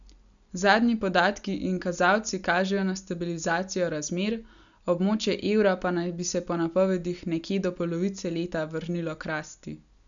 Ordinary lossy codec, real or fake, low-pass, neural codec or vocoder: none; real; 7.2 kHz; none